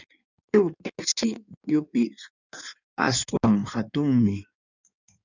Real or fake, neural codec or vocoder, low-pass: fake; codec, 16 kHz in and 24 kHz out, 1.1 kbps, FireRedTTS-2 codec; 7.2 kHz